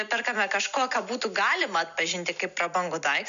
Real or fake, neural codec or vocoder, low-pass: real; none; 7.2 kHz